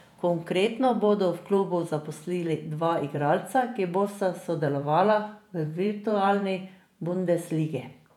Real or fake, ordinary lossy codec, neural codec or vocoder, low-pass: fake; none; vocoder, 48 kHz, 128 mel bands, Vocos; 19.8 kHz